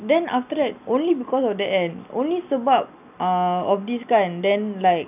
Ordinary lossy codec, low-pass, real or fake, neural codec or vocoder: none; 3.6 kHz; real; none